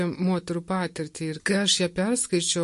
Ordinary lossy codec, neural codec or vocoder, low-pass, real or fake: MP3, 48 kbps; none; 14.4 kHz; real